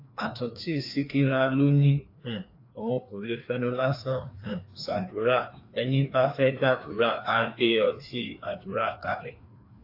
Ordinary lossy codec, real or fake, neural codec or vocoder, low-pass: AAC, 32 kbps; fake; codec, 16 kHz, 2 kbps, FreqCodec, larger model; 5.4 kHz